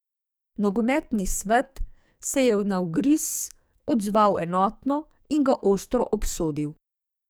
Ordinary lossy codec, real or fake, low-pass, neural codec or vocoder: none; fake; none; codec, 44.1 kHz, 2.6 kbps, SNAC